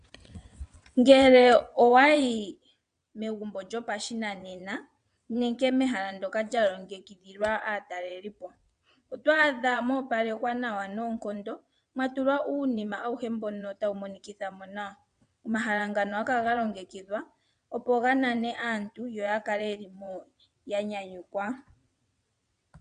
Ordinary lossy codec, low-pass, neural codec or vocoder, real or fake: MP3, 96 kbps; 9.9 kHz; vocoder, 22.05 kHz, 80 mel bands, WaveNeXt; fake